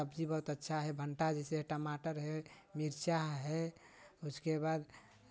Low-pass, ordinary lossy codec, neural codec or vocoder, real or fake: none; none; none; real